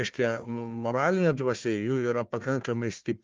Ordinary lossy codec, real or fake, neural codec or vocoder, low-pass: Opus, 24 kbps; fake; codec, 16 kHz, 1 kbps, FunCodec, trained on Chinese and English, 50 frames a second; 7.2 kHz